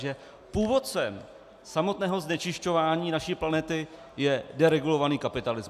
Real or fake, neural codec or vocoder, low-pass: real; none; 14.4 kHz